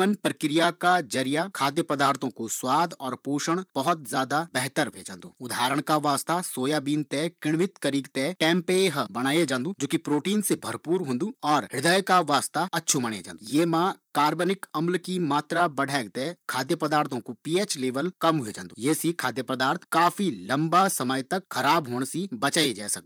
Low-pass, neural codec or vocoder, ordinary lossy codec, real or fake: none; vocoder, 44.1 kHz, 128 mel bands, Pupu-Vocoder; none; fake